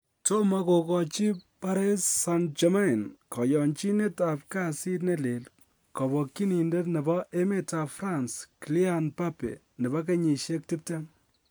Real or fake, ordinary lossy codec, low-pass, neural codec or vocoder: real; none; none; none